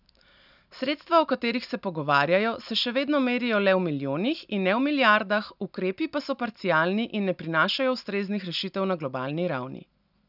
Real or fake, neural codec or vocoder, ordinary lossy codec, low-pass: real; none; none; 5.4 kHz